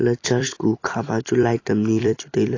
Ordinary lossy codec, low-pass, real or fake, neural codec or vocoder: AAC, 32 kbps; 7.2 kHz; fake; vocoder, 44.1 kHz, 128 mel bands every 256 samples, BigVGAN v2